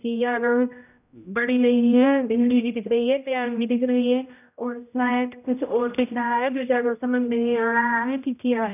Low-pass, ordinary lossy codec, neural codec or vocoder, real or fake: 3.6 kHz; none; codec, 16 kHz, 0.5 kbps, X-Codec, HuBERT features, trained on general audio; fake